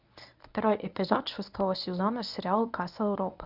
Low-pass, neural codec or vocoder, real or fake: 5.4 kHz; codec, 24 kHz, 0.9 kbps, WavTokenizer, medium speech release version 1; fake